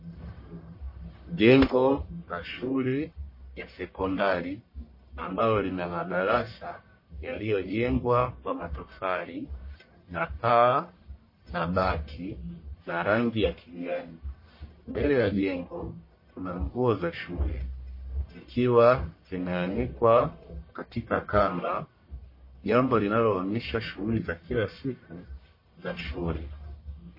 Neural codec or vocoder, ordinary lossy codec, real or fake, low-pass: codec, 44.1 kHz, 1.7 kbps, Pupu-Codec; MP3, 24 kbps; fake; 5.4 kHz